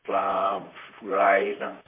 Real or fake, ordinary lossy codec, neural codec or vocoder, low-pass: fake; MP3, 32 kbps; vocoder, 44.1 kHz, 128 mel bands, Pupu-Vocoder; 3.6 kHz